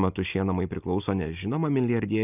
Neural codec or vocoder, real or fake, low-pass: none; real; 3.6 kHz